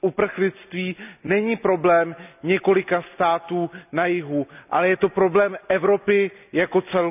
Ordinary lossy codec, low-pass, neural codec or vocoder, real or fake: none; 3.6 kHz; none; real